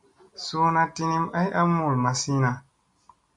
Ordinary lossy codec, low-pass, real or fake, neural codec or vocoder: MP3, 48 kbps; 10.8 kHz; real; none